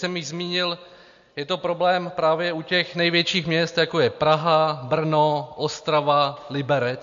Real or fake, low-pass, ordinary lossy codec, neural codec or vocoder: real; 7.2 kHz; MP3, 48 kbps; none